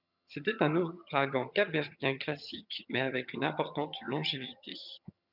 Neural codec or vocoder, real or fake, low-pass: vocoder, 22.05 kHz, 80 mel bands, HiFi-GAN; fake; 5.4 kHz